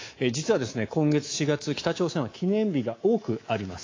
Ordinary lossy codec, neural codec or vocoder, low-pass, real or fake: AAC, 32 kbps; codec, 24 kHz, 3.1 kbps, DualCodec; 7.2 kHz; fake